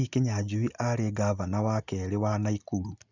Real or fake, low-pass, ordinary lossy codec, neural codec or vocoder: real; 7.2 kHz; none; none